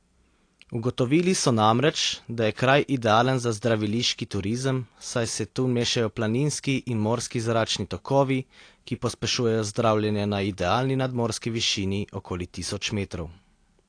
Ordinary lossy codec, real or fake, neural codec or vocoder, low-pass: AAC, 48 kbps; real; none; 9.9 kHz